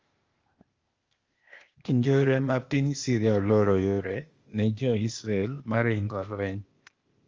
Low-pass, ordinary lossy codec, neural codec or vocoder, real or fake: 7.2 kHz; Opus, 32 kbps; codec, 16 kHz, 0.8 kbps, ZipCodec; fake